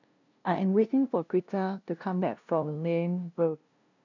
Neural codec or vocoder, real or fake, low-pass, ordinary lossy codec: codec, 16 kHz, 0.5 kbps, FunCodec, trained on LibriTTS, 25 frames a second; fake; 7.2 kHz; none